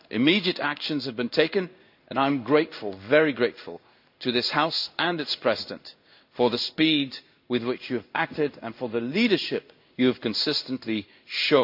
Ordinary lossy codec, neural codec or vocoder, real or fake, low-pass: none; codec, 16 kHz in and 24 kHz out, 1 kbps, XY-Tokenizer; fake; 5.4 kHz